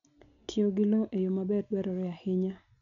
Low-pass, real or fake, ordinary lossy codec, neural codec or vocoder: 7.2 kHz; real; none; none